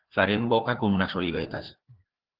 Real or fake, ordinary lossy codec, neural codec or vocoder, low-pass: fake; Opus, 16 kbps; codec, 16 kHz, 2 kbps, FreqCodec, larger model; 5.4 kHz